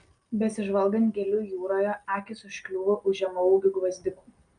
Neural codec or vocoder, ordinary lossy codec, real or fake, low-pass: none; Opus, 32 kbps; real; 9.9 kHz